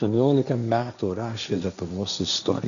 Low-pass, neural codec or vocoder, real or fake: 7.2 kHz; codec, 16 kHz, 1.1 kbps, Voila-Tokenizer; fake